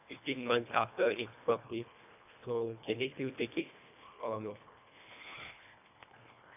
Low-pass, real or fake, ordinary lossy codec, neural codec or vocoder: 3.6 kHz; fake; none; codec, 24 kHz, 1.5 kbps, HILCodec